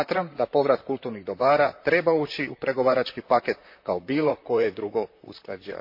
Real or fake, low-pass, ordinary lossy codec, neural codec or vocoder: fake; 5.4 kHz; MP3, 32 kbps; vocoder, 44.1 kHz, 128 mel bands, Pupu-Vocoder